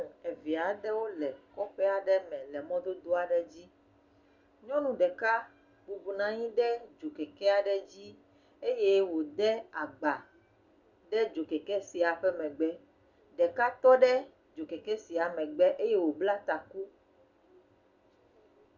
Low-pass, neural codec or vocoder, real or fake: 7.2 kHz; none; real